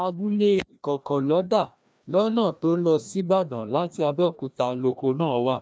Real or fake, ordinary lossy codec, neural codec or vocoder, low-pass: fake; none; codec, 16 kHz, 1 kbps, FreqCodec, larger model; none